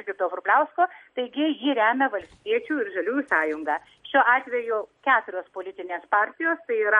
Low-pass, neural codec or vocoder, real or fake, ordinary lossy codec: 19.8 kHz; none; real; MP3, 48 kbps